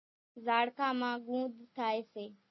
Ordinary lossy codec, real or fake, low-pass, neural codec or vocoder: MP3, 24 kbps; real; 7.2 kHz; none